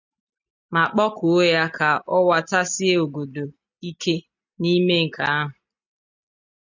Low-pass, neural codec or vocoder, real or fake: 7.2 kHz; none; real